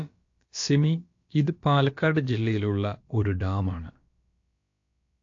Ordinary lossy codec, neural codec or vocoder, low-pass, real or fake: AAC, 48 kbps; codec, 16 kHz, about 1 kbps, DyCAST, with the encoder's durations; 7.2 kHz; fake